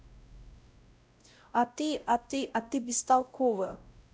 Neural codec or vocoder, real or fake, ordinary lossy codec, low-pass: codec, 16 kHz, 0.5 kbps, X-Codec, WavLM features, trained on Multilingual LibriSpeech; fake; none; none